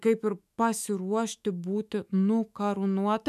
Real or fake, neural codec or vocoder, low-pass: fake; autoencoder, 48 kHz, 128 numbers a frame, DAC-VAE, trained on Japanese speech; 14.4 kHz